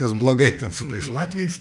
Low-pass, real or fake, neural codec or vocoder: 10.8 kHz; fake; autoencoder, 48 kHz, 32 numbers a frame, DAC-VAE, trained on Japanese speech